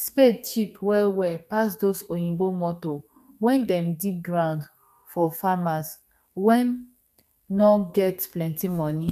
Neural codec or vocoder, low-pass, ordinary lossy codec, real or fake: codec, 32 kHz, 1.9 kbps, SNAC; 14.4 kHz; none; fake